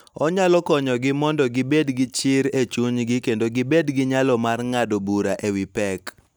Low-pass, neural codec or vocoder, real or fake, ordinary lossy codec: none; none; real; none